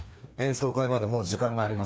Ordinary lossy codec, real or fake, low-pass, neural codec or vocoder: none; fake; none; codec, 16 kHz, 2 kbps, FreqCodec, larger model